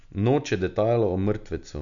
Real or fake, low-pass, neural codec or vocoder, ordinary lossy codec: real; 7.2 kHz; none; none